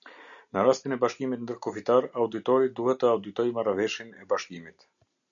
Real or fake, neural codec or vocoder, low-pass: real; none; 7.2 kHz